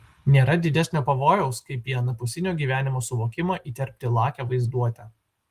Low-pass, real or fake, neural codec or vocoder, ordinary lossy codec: 14.4 kHz; real; none; Opus, 24 kbps